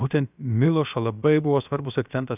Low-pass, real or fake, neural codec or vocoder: 3.6 kHz; fake; codec, 16 kHz, about 1 kbps, DyCAST, with the encoder's durations